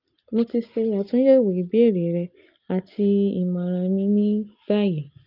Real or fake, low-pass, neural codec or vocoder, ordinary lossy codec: real; 5.4 kHz; none; Opus, 24 kbps